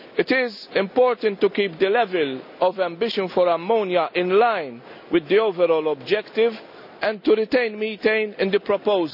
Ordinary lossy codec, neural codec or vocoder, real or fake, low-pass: none; none; real; 5.4 kHz